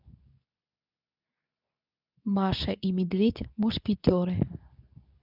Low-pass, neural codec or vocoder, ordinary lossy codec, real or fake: 5.4 kHz; codec, 24 kHz, 0.9 kbps, WavTokenizer, medium speech release version 2; none; fake